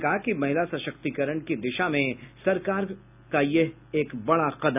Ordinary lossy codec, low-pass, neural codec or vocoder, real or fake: none; 3.6 kHz; none; real